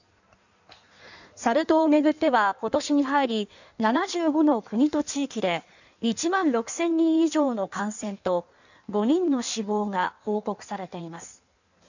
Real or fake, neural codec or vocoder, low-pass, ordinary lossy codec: fake; codec, 16 kHz in and 24 kHz out, 1.1 kbps, FireRedTTS-2 codec; 7.2 kHz; none